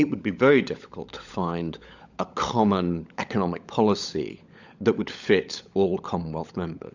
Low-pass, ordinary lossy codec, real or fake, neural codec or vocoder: 7.2 kHz; Opus, 64 kbps; fake; codec, 16 kHz, 16 kbps, FunCodec, trained on LibriTTS, 50 frames a second